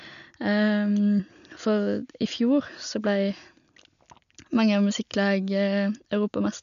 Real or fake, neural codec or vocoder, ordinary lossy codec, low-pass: real; none; none; 7.2 kHz